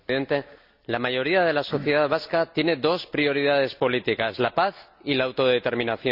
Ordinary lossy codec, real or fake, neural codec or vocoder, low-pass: none; real; none; 5.4 kHz